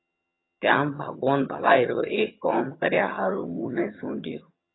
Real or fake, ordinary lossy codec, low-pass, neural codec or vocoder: fake; AAC, 16 kbps; 7.2 kHz; vocoder, 22.05 kHz, 80 mel bands, HiFi-GAN